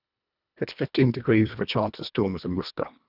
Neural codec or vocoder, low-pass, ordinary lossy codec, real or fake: codec, 24 kHz, 1.5 kbps, HILCodec; 5.4 kHz; none; fake